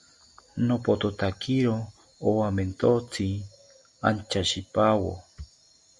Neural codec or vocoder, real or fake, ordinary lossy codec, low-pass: none; real; Opus, 64 kbps; 10.8 kHz